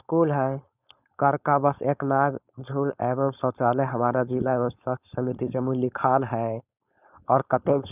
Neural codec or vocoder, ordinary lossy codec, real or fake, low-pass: codec, 16 kHz, 4.8 kbps, FACodec; none; fake; 3.6 kHz